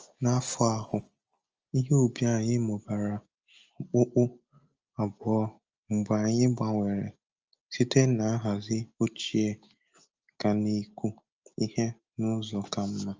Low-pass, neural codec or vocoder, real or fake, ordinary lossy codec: 7.2 kHz; none; real; Opus, 24 kbps